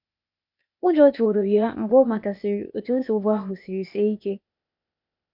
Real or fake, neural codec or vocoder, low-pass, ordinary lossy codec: fake; codec, 16 kHz, 0.8 kbps, ZipCodec; 5.4 kHz; none